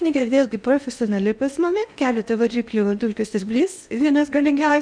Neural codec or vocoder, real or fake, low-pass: codec, 16 kHz in and 24 kHz out, 0.8 kbps, FocalCodec, streaming, 65536 codes; fake; 9.9 kHz